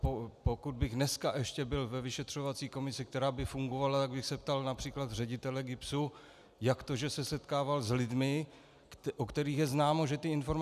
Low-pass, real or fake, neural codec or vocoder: 14.4 kHz; real; none